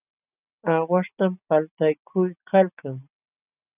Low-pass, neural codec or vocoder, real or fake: 3.6 kHz; none; real